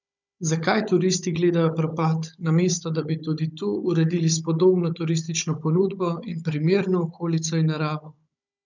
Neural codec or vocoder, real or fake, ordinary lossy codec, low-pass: codec, 16 kHz, 16 kbps, FunCodec, trained on Chinese and English, 50 frames a second; fake; none; 7.2 kHz